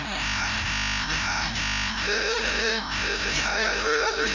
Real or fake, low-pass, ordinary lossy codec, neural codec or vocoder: fake; 7.2 kHz; none; codec, 16 kHz, 0.5 kbps, FreqCodec, larger model